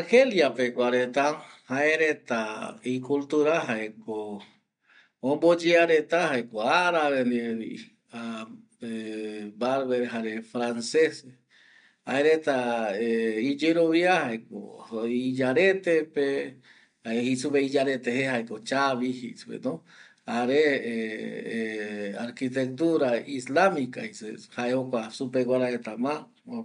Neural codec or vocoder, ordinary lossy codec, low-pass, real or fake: none; MP3, 64 kbps; 9.9 kHz; real